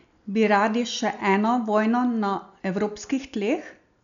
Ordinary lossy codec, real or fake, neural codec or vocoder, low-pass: none; real; none; 7.2 kHz